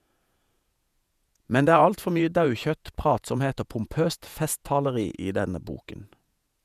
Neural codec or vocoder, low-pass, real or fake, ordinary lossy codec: vocoder, 48 kHz, 128 mel bands, Vocos; 14.4 kHz; fake; none